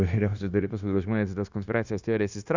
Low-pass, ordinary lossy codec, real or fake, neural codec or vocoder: 7.2 kHz; Opus, 64 kbps; fake; codec, 16 kHz, 0.9 kbps, LongCat-Audio-Codec